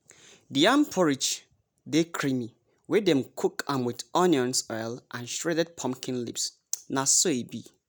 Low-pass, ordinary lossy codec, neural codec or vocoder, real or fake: none; none; none; real